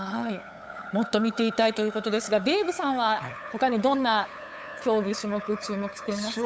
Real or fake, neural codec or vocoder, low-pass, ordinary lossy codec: fake; codec, 16 kHz, 8 kbps, FunCodec, trained on LibriTTS, 25 frames a second; none; none